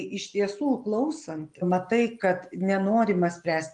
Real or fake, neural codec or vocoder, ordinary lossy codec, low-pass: real; none; Opus, 32 kbps; 10.8 kHz